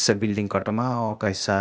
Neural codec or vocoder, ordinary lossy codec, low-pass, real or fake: codec, 16 kHz, 0.8 kbps, ZipCodec; none; none; fake